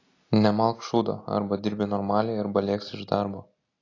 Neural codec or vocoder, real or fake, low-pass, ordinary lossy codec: none; real; 7.2 kHz; AAC, 32 kbps